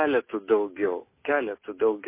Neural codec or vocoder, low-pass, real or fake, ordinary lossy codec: none; 3.6 kHz; real; MP3, 24 kbps